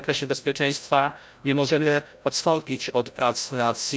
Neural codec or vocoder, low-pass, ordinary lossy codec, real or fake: codec, 16 kHz, 0.5 kbps, FreqCodec, larger model; none; none; fake